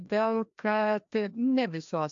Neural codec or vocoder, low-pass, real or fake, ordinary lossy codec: codec, 16 kHz, 1 kbps, FreqCodec, larger model; 7.2 kHz; fake; AAC, 64 kbps